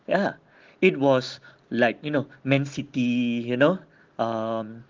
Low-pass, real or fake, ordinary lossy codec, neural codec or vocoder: 7.2 kHz; real; Opus, 16 kbps; none